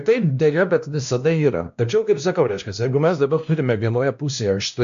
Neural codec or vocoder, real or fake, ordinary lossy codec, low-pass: codec, 16 kHz, 1 kbps, X-Codec, WavLM features, trained on Multilingual LibriSpeech; fake; AAC, 96 kbps; 7.2 kHz